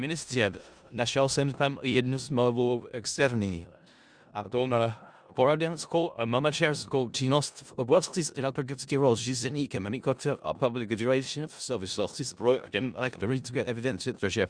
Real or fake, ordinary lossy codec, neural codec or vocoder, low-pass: fake; Opus, 64 kbps; codec, 16 kHz in and 24 kHz out, 0.4 kbps, LongCat-Audio-Codec, four codebook decoder; 9.9 kHz